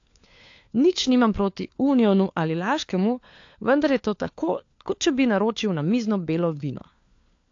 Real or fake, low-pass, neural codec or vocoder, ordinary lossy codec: fake; 7.2 kHz; codec, 16 kHz, 4 kbps, FunCodec, trained on LibriTTS, 50 frames a second; AAC, 48 kbps